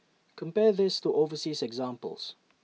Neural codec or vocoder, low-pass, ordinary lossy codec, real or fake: none; none; none; real